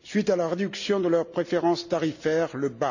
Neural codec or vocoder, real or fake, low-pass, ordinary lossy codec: none; real; 7.2 kHz; none